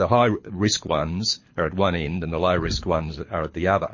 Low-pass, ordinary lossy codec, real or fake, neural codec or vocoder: 7.2 kHz; MP3, 32 kbps; fake; codec, 24 kHz, 6 kbps, HILCodec